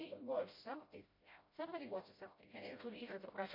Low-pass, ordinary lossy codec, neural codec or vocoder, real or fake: 5.4 kHz; MP3, 32 kbps; codec, 16 kHz, 0.5 kbps, FreqCodec, smaller model; fake